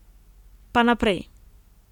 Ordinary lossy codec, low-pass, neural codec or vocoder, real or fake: none; 19.8 kHz; none; real